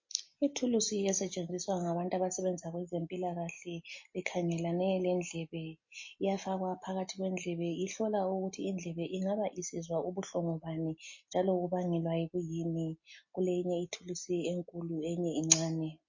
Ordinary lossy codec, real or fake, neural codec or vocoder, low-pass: MP3, 32 kbps; real; none; 7.2 kHz